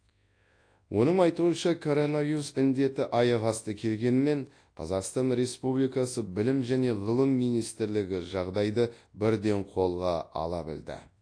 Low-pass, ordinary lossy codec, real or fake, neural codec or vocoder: 9.9 kHz; AAC, 48 kbps; fake; codec, 24 kHz, 0.9 kbps, WavTokenizer, large speech release